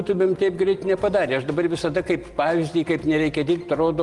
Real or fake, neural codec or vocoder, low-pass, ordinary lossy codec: real; none; 10.8 kHz; Opus, 24 kbps